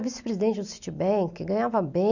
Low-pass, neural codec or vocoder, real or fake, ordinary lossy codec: 7.2 kHz; none; real; none